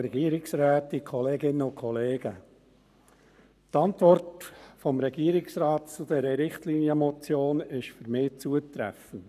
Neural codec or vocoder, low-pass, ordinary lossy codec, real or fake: codec, 44.1 kHz, 7.8 kbps, Pupu-Codec; 14.4 kHz; none; fake